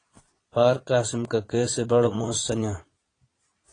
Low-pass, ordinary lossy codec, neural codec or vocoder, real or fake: 9.9 kHz; AAC, 32 kbps; vocoder, 22.05 kHz, 80 mel bands, Vocos; fake